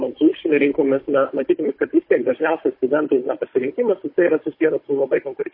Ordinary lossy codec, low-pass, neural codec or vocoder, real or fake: MP3, 24 kbps; 5.4 kHz; codec, 16 kHz, 16 kbps, FunCodec, trained on Chinese and English, 50 frames a second; fake